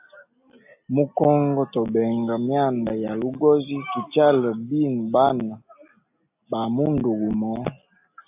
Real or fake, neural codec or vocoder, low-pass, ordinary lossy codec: real; none; 3.6 kHz; AAC, 32 kbps